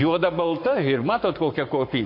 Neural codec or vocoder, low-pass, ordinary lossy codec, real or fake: codec, 44.1 kHz, 7.8 kbps, Pupu-Codec; 5.4 kHz; MP3, 32 kbps; fake